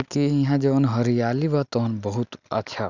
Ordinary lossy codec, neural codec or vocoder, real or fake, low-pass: none; none; real; 7.2 kHz